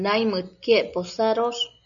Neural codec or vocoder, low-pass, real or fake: none; 7.2 kHz; real